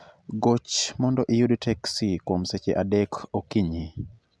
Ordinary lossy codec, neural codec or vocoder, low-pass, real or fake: none; none; none; real